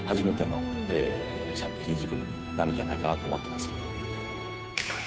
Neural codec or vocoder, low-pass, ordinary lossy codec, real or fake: codec, 16 kHz, 2 kbps, FunCodec, trained on Chinese and English, 25 frames a second; none; none; fake